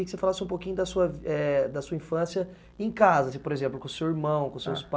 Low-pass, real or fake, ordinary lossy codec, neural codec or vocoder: none; real; none; none